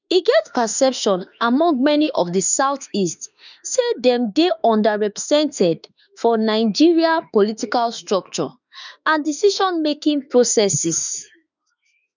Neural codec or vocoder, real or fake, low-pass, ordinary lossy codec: autoencoder, 48 kHz, 32 numbers a frame, DAC-VAE, trained on Japanese speech; fake; 7.2 kHz; none